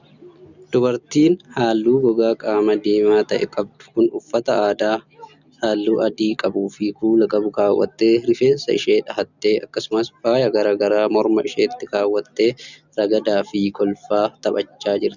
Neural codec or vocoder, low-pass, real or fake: none; 7.2 kHz; real